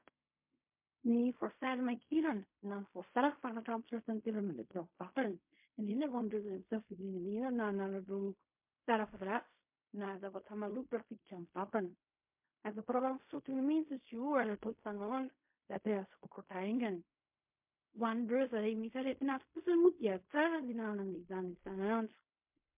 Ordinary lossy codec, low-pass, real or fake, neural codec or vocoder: MP3, 32 kbps; 3.6 kHz; fake; codec, 16 kHz in and 24 kHz out, 0.4 kbps, LongCat-Audio-Codec, fine tuned four codebook decoder